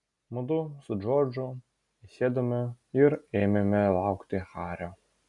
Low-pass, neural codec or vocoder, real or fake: 10.8 kHz; none; real